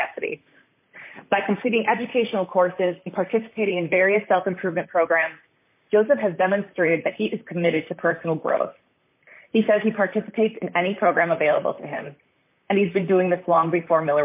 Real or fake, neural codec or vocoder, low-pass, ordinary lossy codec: fake; vocoder, 44.1 kHz, 128 mel bands, Pupu-Vocoder; 3.6 kHz; MP3, 24 kbps